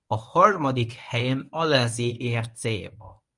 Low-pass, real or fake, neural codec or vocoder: 10.8 kHz; fake; codec, 24 kHz, 0.9 kbps, WavTokenizer, medium speech release version 1